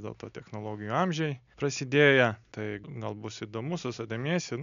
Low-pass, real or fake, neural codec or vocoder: 7.2 kHz; real; none